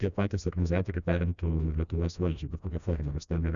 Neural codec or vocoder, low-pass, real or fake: codec, 16 kHz, 1 kbps, FreqCodec, smaller model; 7.2 kHz; fake